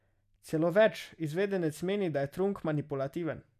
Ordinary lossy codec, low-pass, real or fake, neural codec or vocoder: none; 14.4 kHz; real; none